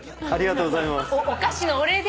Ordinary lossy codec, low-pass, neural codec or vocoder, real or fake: none; none; none; real